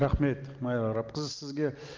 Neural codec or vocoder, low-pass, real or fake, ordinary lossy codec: none; 7.2 kHz; real; Opus, 32 kbps